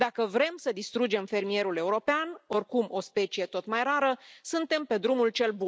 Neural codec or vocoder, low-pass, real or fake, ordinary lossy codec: none; none; real; none